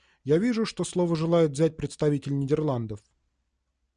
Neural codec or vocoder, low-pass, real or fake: none; 9.9 kHz; real